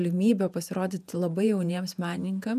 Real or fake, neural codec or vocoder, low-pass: fake; vocoder, 48 kHz, 128 mel bands, Vocos; 14.4 kHz